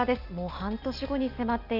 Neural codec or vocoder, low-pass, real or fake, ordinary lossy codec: none; 5.4 kHz; real; none